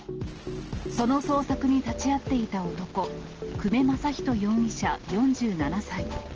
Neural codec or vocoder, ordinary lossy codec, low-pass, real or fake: none; Opus, 16 kbps; 7.2 kHz; real